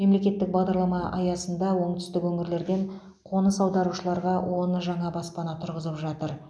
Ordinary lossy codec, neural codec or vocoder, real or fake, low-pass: none; none; real; none